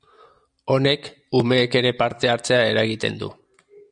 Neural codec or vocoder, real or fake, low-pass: none; real; 9.9 kHz